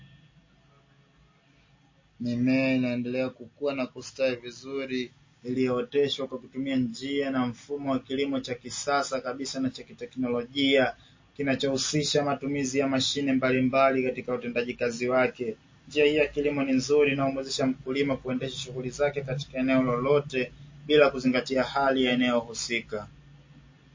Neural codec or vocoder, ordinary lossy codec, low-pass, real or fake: none; MP3, 32 kbps; 7.2 kHz; real